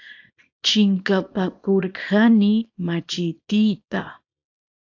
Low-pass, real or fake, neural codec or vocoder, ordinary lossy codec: 7.2 kHz; fake; codec, 24 kHz, 0.9 kbps, WavTokenizer, small release; AAC, 48 kbps